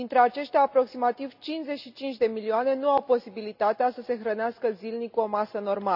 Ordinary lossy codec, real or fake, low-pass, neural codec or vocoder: none; real; 5.4 kHz; none